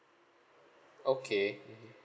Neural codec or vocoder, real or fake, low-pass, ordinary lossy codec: none; real; none; none